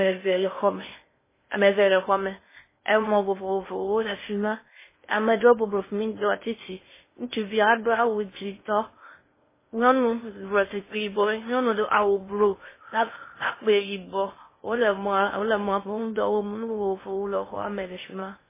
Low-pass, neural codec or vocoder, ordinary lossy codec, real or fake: 3.6 kHz; codec, 16 kHz in and 24 kHz out, 0.6 kbps, FocalCodec, streaming, 2048 codes; MP3, 16 kbps; fake